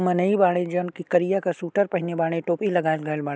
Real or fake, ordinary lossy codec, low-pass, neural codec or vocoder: real; none; none; none